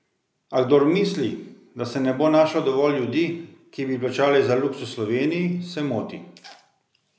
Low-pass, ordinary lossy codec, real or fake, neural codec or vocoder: none; none; real; none